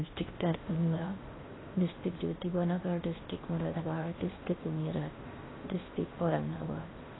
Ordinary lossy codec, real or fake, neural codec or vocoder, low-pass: AAC, 16 kbps; fake; codec, 16 kHz in and 24 kHz out, 0.6 kbps, FocalCodec, streaming, 4096 codes; 7.2 kHz